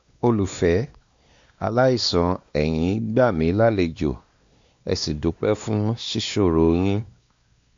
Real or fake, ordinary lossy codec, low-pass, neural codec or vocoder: fake; MP3, 64 kbps; 7.2 kHz; codec, 16 kHz, 2 kbps, X-Codec, WavLM features, trained on Multilingual LibriSpeech